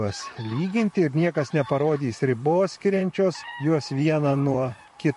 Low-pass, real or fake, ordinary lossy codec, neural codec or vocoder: 14.4 kHz; fake; MP3, 48 kbps; vocoder, 44.1 kHz, 128 mel bands, Pupu-Vocoder